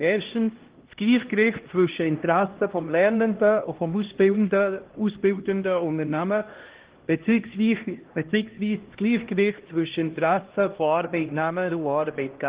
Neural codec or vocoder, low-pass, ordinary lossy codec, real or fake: codec, 16 kHz, 1 kbps, X-Codec, HuBERT features, trained on LibriSpeech; 3.6 kHz; Opus, 16 kbps; fake